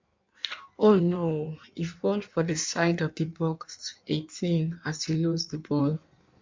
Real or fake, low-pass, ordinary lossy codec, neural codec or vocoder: fake; 7.2 kHz; MP3, 64 kbps; codec, 16 kHz in and 24 kHz out, 1.1 kbps, FireRedTTS-2 codec